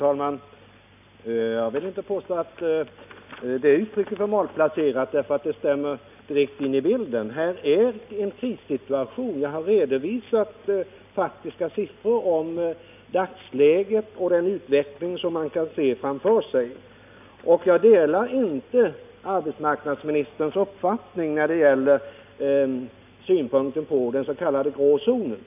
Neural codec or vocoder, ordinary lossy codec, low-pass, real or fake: none; none; 3.6 kHz; real